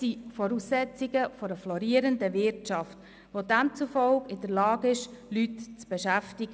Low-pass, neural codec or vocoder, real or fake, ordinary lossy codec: none; none; real; none